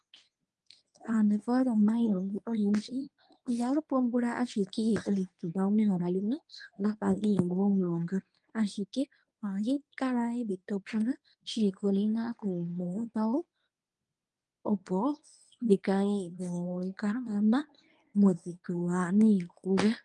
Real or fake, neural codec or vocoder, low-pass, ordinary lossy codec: fake; codec, 24 kHz, 0.9 kbps, WavTokenizer, medium speech release version 1; 10.8 kHz; Opus, 32 kbps